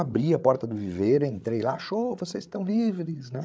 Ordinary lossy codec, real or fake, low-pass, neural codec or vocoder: none; fake; none; codec, 16 kHz, 8 kbps, FreqCodec, larger model